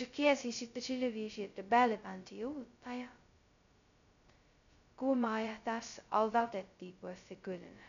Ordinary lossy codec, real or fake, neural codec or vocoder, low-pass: none; fake; codec, 16 kHz, 0.2 kbps, FocalCodec; 7.2 kHz